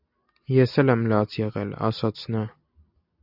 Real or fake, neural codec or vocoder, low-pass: real; none; 5.4 kHz